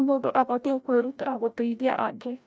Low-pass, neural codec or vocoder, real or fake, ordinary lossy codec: none; codec, 16 kHz, 0.5 kbps, FreqCodec, larger model; fake; none